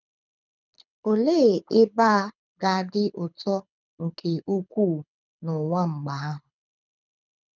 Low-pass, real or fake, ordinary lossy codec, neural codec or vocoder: 7.2 kHz; fake; none; codec, 24 kHz, 6 kbps, HILCodec